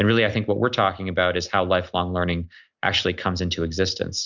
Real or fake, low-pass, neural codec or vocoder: real; 7.2 kHz; none